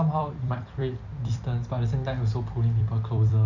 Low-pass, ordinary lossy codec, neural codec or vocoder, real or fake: 7.2 kHz; none; vocoder, 44.1 kHz, 128 mel bands every 256 samples, BigVGAN v2; fake